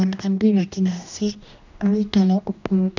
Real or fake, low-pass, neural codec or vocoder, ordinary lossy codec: fake; 7.2 kHz; codec, 16 kHz, 1 kbps, X-Codec, HuBERT features, trained on general audio; none